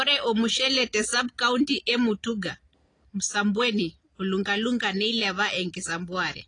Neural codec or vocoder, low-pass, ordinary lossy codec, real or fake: none; 9.9 kHz; AAC, 32 kbps; real